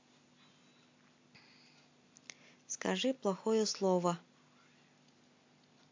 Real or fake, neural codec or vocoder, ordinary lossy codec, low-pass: real; none; MP3, 48 kbps; 7.2 kHz